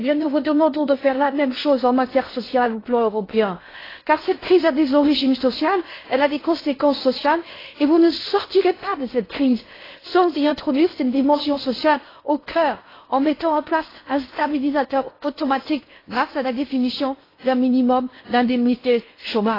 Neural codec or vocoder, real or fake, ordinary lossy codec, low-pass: codec, 16 kHz in and 24 kHz out, 0.6 kbps, FocalCodec, streaming, 4096 codes; fake; AAC, 24 kbps; 5.4 kHz